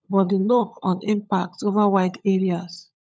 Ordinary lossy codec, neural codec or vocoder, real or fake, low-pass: none; codec, 16 kHz, 16 kbps, FunCodec, trained on LibriTTS, 50 frames a second; fake; none